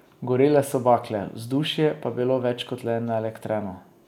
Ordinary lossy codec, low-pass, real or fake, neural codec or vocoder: none; 19.8 kHz; real; none